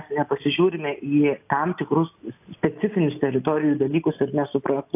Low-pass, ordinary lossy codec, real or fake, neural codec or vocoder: 3.6 kHz; AAC, 32 kbps; real; none